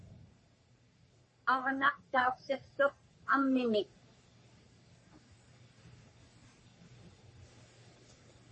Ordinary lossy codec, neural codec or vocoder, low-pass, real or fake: MP3, 32 kbps; codec, 44.1 kHz, 3.4 kbps, Pupu-Codec; 10.8 kHz; fake